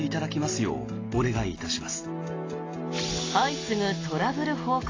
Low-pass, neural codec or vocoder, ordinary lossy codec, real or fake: 7.2 kHz; none; AAC, 32 kbps; real